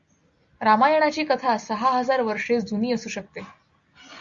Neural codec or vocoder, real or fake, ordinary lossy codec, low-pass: none; real; Opus, 64 kbps; 7.2 kHz